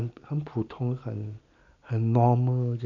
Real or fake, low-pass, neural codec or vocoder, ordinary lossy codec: real; 7.2 kHz; none; none